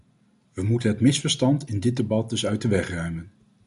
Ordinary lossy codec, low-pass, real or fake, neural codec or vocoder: MP3, 96 kbps; 10.8 kHz; real; none